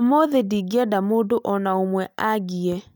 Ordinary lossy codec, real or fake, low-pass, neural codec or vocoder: none; real; none; none